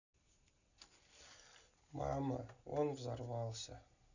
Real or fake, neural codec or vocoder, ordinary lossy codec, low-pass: real; none; none; 7.2 kHz